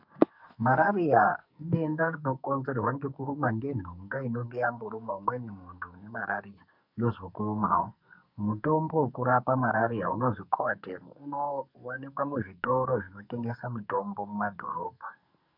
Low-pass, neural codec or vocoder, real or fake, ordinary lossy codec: 5.4 kHz; codec, 44.1 kHz, 2.6 kbps, SNAC; fake; MP3, 48 kbps